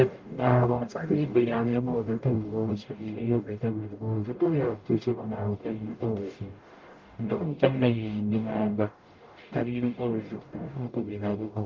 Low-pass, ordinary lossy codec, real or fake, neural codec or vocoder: 7.2 kHz; Opus, 32 kbps; fake; codec, 44.1 kHz, 0.9 kbps, DAC